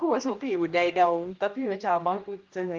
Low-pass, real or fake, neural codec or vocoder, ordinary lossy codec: 7.2 kHz; fake; codec, 16 kHz, 1 kbps, X-Codec, HuBERT features, trained on general audio; Opus, 32 kbps